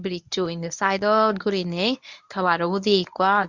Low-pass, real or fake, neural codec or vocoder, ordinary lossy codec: 7.2 kHz; fake; codec, 24 kHz, 0.9 kbps, WavTokenizer, medium speech release version 1; Opus, 64 kbps